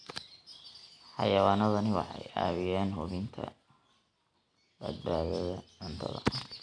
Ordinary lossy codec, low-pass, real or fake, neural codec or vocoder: none; 9.9 kHz; real; none